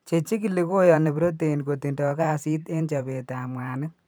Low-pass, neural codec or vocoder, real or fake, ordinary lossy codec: none; vocoder, 44.1 kHz, 128 mel bands every 512 samples, BigVGAN v2; fake; none